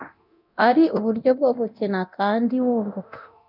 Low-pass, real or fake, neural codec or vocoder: 5.4 kHz; fake; codec, 24 kHz, 0.9 kbps, DualCodec